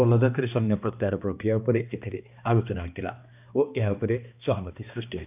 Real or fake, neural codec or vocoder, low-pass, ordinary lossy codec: fake; codec, 16 kHz, 2 kbps, X-Codec, HuBERT features, trained on balanced general audio; 3.6 kHz; none